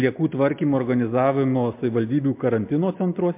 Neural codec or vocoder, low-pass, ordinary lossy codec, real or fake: none; 3.6 kHz; MP3, 32 kbps; real